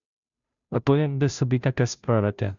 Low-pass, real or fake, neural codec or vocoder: 7.2 kHz; fake; codec, 16 kHz, 0.5 kbps, FunCodec, trained on Chinese and English, 25 frames a second